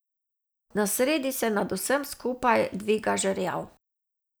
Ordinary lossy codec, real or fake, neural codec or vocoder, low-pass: none; real; none; none